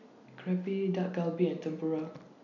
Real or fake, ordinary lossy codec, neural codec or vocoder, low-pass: real; none; none; 7.2 kHz